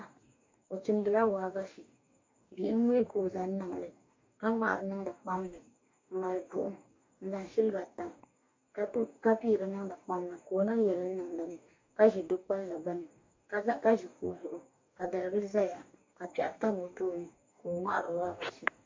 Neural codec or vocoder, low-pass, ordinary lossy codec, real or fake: codec, 44.1 kHz, 2.6 kbps, DAC; 7.2 kHz; MP3, 48 kbps; fake